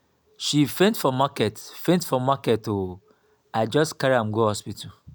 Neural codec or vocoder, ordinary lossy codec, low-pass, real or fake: none; none; none; real